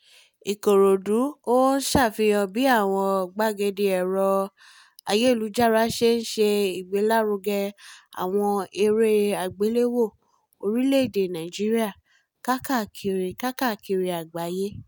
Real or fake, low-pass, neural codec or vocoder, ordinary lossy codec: real; none; none; none